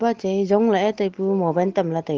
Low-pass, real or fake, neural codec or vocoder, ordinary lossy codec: 7.2 kHz; real; none; Opus, 16 kbps